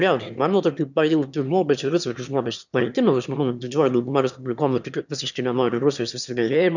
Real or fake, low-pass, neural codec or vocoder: fake; 7.2 kHz; autoencoder, 22.05 kHz, a latent of 192 numbers a frame, VITS, trained on one speaker